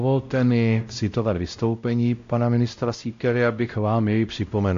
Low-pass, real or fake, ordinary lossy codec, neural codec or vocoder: 7.2 kHz; fake; AAC, 48 kbps; codec, 16 kHz, 0.5 kbps, X-Codec, WavLM features, trained on Multilingual LibriSpeech